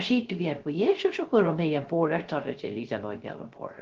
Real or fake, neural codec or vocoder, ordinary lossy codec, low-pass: fake; codec, 16 kHz, 0.3 kbps, FocalCodec; Opus, 16 kbps; 7.2 kHz